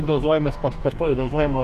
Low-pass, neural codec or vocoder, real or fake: 14.4 kHz; codec, 44.1 kHz, 2.6 kbps, DAC; fake